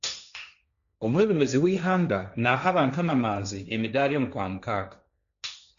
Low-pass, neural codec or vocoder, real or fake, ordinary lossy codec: 7.2 kHz; codec, 16 kHz, 1.1 kbps, Voila-Tokenizer; fake; MP3, 96 kbps